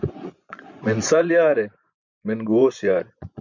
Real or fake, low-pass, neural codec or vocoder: fake; 7.2 kHz; vocoder, 24 kHz, 100 mel bands, Vocos